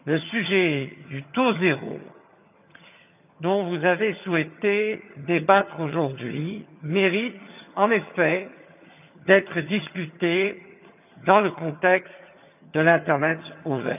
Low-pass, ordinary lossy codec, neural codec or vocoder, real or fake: 3.6 kHz; none; vocoder, 22.05 kHz, 80 mel bands, HiFi-GAN; fake